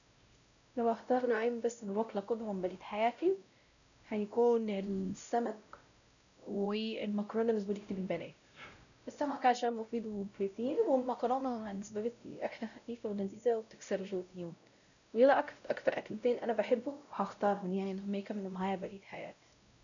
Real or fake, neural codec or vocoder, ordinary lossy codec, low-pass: fake; codec, 16 kHz, 0.5 kbps, X-Codec, WavLM features, trained on Multilingual LibriSpeech; none; 7.2 kHz